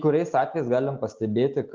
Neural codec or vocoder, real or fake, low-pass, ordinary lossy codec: none; real; 7.2 kHz; Opus, 24 kbps